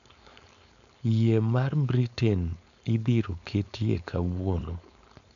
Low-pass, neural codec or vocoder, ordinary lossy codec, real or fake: 7.2 kHz; codec, 16 kHz, 4.8 kbps, FACodec; none; fake